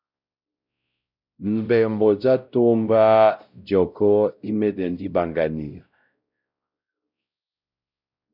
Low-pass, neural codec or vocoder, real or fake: 5.4 kHz; codec, 16 kHz, 0.5 kbps, X-Codec, WavLM features, trained on Multilingual LibriSpeech; fake